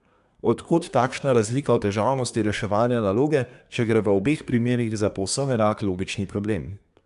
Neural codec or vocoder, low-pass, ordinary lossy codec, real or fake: codec, 24 kHz, 1 kbps, SNAC; 10.8 kHz; none; fake